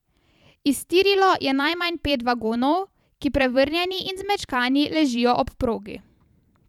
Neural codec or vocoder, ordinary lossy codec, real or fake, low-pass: vocoder, 44.1 kHz, 128 mel bands every 512 samples, BigVGAN v2; none; fake; 19.8 kHz